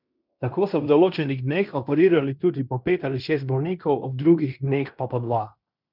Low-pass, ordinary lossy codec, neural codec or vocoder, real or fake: 5.4 kHz; none; codec, 16 kHz in and 24 kHz out, 0.9 kbps, LongCat-Audio-Codec, fine tuned four codebook decoder; fake